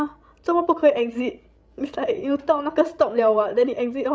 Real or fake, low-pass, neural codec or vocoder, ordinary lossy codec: fake; none; codec, 16 kHz, 16 kbps, FreqCodec, larger model; none